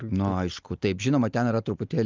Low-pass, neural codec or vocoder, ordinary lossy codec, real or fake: 7.2 kHz; none; Opus, 24 kbps; real